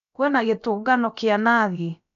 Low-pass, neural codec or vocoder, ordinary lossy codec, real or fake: 7.2 kHz; codec, 16 kHz, about 1 kbps, DyCAST, with the encoder's durations; none; fake